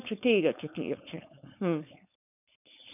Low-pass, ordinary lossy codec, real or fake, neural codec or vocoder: 3.6 kHz; none; fake; codec, 16 kHz, 4.8 kbps, FACodec